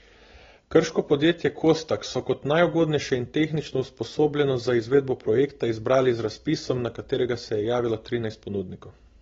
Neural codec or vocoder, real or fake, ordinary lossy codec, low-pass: none; real; AAC, 24 kbps; 7.2 kHz